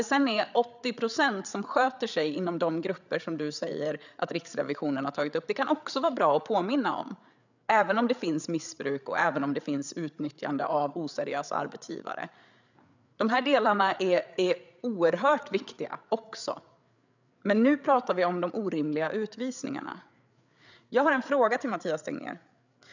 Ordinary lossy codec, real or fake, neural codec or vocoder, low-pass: none; fake; codec, 16 kHz, 8 kbps, FreqCodec, larger model; 7.2 kHz